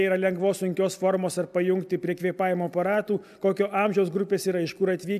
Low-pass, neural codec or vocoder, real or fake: 14.4 kHz; none; real